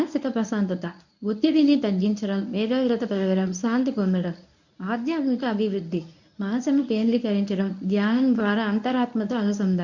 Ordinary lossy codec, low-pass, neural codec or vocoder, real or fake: none; 7.2 kHz; codec, 24 kHz, 0.9 kbps, WavTokenizer, medium speech release version 1; fake